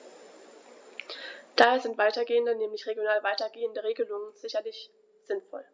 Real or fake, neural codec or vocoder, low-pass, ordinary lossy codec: real; none; none; none